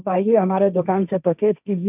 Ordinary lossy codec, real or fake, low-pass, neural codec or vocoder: none; fake; 3.6 kHz; codec, 16 kHz, 1.1 kbps, Voila-Tokenizer